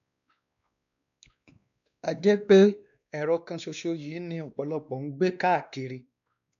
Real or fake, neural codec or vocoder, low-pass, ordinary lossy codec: fake; codec, 16 kHz, 2 kbps, X-Codec, WavLM features, trained on Multilingual LibriSpeech; 7.2 kHz; none